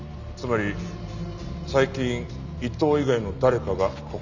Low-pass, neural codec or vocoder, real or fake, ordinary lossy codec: 7.2 kHz; none; real; none